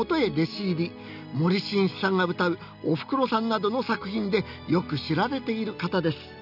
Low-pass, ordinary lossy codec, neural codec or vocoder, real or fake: 5.4 kHz; none; none; real